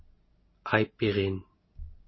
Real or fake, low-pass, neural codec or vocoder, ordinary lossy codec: real; 7.2 kHz; none; MP3, 24 kbps